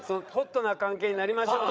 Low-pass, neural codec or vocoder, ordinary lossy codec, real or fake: none; codec, 16 kHz, 8 kbps, FreqCodec, larger model; none; fake